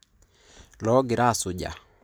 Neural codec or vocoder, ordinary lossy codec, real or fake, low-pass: none; none; real; none